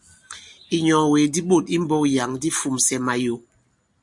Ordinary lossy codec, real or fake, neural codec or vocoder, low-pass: MP3, 64 kbps; real; none; 10.8 kHz